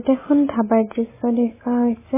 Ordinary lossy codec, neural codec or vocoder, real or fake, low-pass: MP3, 16 kbps; none; real; 3.6 kHz